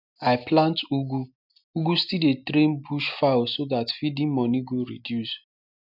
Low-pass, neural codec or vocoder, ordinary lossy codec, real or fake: 5.4 kHz; none; none; real